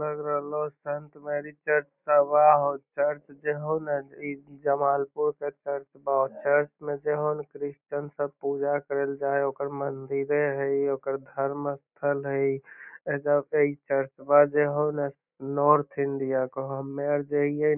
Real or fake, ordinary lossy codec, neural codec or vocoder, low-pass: real; none; none; 3.6 kHz